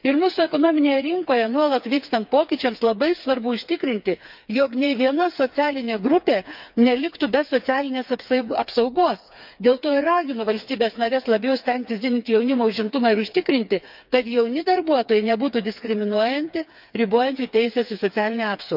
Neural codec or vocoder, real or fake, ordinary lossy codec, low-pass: codec, 16 kHz, 4 kbps, FreqCodec, smaller model; fake; none; 5.4 kHz